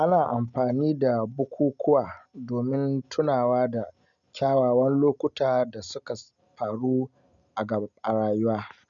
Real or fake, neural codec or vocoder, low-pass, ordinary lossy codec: real; none; 7.2 kHz; none